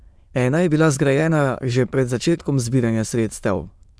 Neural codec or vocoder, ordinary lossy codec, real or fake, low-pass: autoencoder, 22.05 kHz, a latent of 192 numbers a frame, VITS, trained on many speakers; none; fake; none